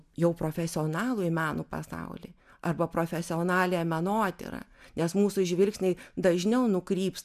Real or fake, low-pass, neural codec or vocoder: real; 14.4 kHz; none